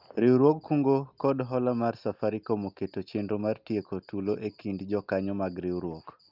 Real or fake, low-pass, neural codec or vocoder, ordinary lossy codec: real; 5.4 kHz; none; Opus, 24 kbps